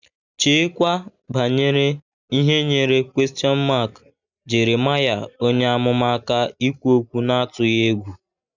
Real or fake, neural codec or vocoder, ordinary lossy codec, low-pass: real; none; none; 7.2 kHz